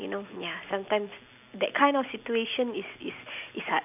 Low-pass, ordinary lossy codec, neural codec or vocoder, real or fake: 3.6 kHz; none; none; real